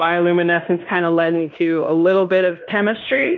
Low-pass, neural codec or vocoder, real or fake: 7.2 kHz; codec, 16 kHz in and 24 kHz out, 0.9 kbps, LongCat-Audio-Codec, fine tuned four codebook decoder; fake